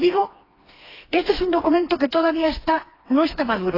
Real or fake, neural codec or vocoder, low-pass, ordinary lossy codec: fake; codec, 16 kHz, 2 kbps, FreqCodec, smaller model; 5.4 kHz; AAC, 24 kbps